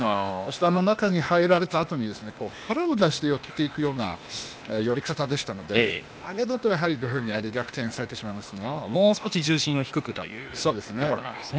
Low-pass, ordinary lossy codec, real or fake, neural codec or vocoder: none; none; fake; codec, 16 kHz, 0.8 kbps, ZipCodec